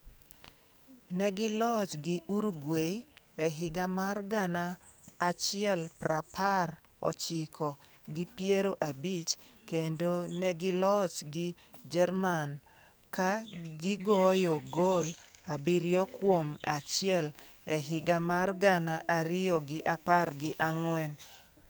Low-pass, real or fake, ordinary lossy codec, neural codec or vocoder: none; fake; none; codec, 44.1 kHz, 2.6 kbps, SNAC